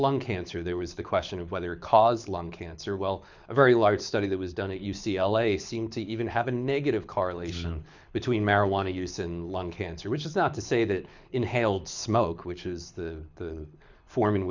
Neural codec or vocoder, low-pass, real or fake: codec, 24 kHz, 6 kbps, HILCodec; 7.2 kHz; fake